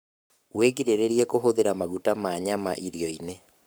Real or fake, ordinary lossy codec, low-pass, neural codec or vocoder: fake; none; none; codec, 44.1 kHz, 7.8 kbps, Pupu-Codec